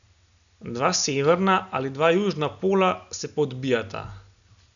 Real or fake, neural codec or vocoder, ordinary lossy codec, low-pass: real; none; none; 7.2 kHz